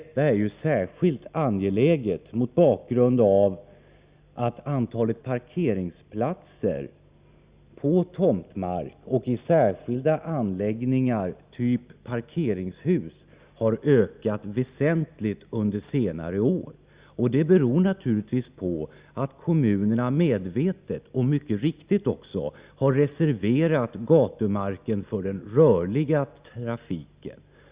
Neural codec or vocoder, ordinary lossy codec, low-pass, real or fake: none; Opus, 64 kbps; 3.6 kHz; real